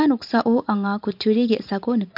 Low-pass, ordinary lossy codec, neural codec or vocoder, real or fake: 5.4 kHz; MP3, 48 kbps; vocoder, 44.1 kHz, 128 mel bands every 512 samples, BigVGAN v2; fake